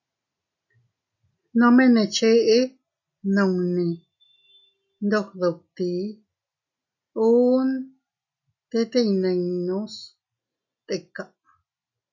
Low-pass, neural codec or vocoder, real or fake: 7.2 kHz; none; real